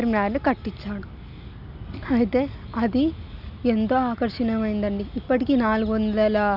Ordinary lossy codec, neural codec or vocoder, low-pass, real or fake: none; none; 5.4 kHz; real